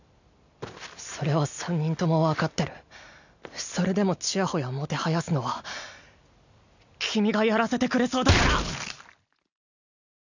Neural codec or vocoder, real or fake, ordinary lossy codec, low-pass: none; real; none; 7.2 kHz